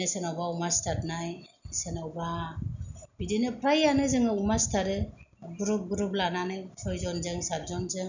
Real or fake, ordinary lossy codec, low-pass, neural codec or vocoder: real; none; 7.2 kHz; none